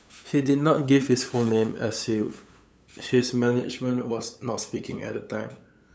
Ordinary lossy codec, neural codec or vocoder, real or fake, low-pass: none; codec, 16 kHz, 8 kbps, FunCodec, trained on LibriTTS, 25 frames a second; fake; none